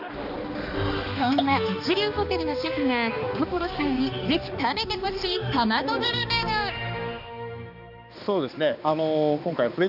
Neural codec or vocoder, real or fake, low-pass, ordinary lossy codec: codec, 16 kHz, 2 kbps, X-Codec, HuBERT features, trained on balanced general audio; fake; 5.4 kHz; none